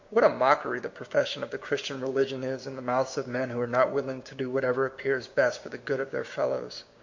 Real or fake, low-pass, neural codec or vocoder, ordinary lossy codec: fake; 7.2 kHz; codec, 16 kHz, 6 kbps, DAC; MP3, 48 kbps